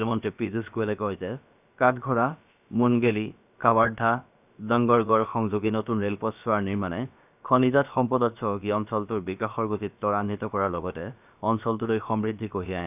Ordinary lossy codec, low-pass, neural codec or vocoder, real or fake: none; 3.6 kHz; codec, 16 kHz, about 1 kbps, DyCAST, with the encoder's durations; fake